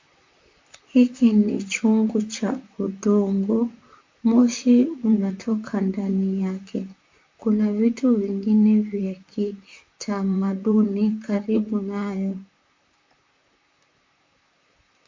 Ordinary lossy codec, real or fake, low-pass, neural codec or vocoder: MP3, 48 kbps; fake; 7.2 kHz; vocoder, 44.1 kHz, 128 mel bands, Pupu-Vocoder